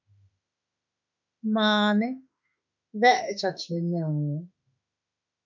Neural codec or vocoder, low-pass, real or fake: autoencoder, 48 kHz, 32 numbers a frame, DAC-VAE, trained on Japanese speech; 7.2 kHz; fake